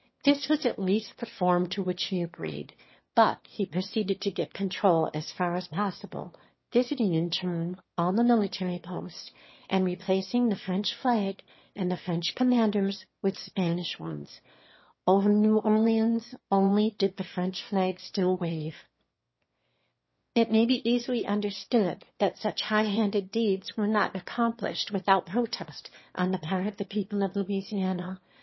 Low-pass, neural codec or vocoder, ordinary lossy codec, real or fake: 7.2 kHz; autoencoder, 22.05 kHz, a latent of 192 numbers a frame, VITS, trained on one speaker; MP3, 24 kbps; fake